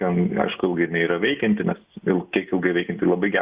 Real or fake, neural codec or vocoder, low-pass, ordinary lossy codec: real; none; 3.6 kHz; Opus, 64 kbps